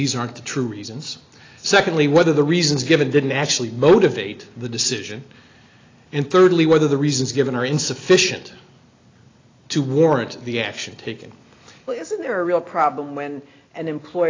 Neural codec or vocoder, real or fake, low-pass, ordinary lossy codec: none; real; 7.2 kHz; AAC, 32 kbps